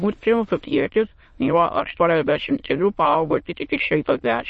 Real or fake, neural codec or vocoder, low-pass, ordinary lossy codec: fake; autoencoder, 22.05 kHz, a latent of 192 numbers a frame, VITS, trained on many speakers; 9.9 kHz; MP3, 32 kbps